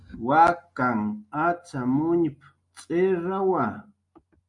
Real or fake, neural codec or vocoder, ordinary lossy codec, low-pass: real; none; MP3, 96 kbps; 10.8 kHz